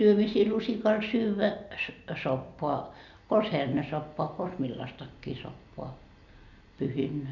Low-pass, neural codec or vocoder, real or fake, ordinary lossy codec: 7.2 kHz; none; real; none